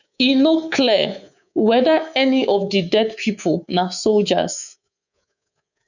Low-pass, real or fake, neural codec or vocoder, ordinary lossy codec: 7.2 kHz; fake; codec, 24 kHz, 3.1 kbps, DualCodec; none